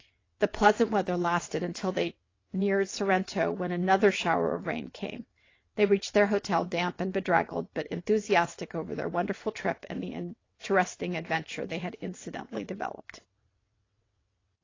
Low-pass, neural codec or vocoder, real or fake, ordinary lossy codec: 7.2 kHz; vocoder, 22.05 kHz, 80 mel bands, WaveNeXt; fake; AAC, 32 kbps